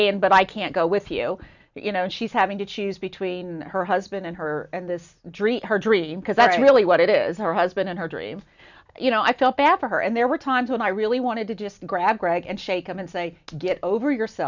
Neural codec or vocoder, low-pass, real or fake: none; 7.2 kHz; real